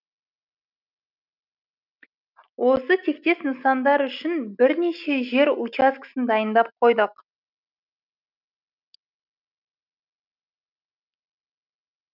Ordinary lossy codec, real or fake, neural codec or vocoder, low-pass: none; real; none; 5.4 kHz